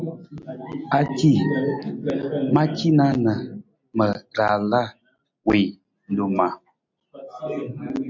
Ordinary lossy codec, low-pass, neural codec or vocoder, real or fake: MP3, 48 kbps; 7.2 kHz; none; real